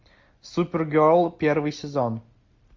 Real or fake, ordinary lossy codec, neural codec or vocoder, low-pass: real; MP3, 48 kbps; none; 7.2 kHz